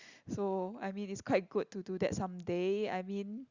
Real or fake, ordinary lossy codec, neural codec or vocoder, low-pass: real; Opus, 64 kbps; none; 7.2 kHz